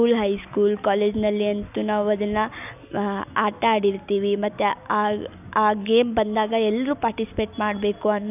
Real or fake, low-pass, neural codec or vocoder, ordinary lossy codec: real; 3.6 kHz; none; none